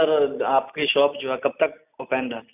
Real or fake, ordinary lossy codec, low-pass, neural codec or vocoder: real; none; 3.6 kHz; none